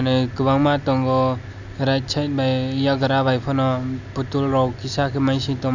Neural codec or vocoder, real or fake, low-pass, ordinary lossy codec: none; real; 7.2 kHz; none